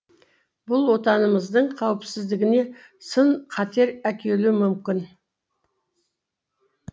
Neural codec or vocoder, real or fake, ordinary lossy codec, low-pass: none; real; none; none